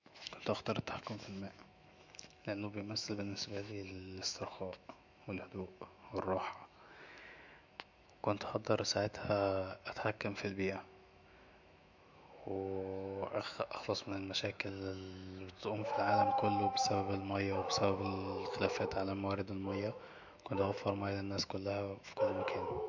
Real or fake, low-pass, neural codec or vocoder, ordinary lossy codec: fake; 7.2 kHz; autoencoder, 48 kHz, 128 numbers a frame, DAC-VAE, trained on Japanese speech; MP3, 64 kbps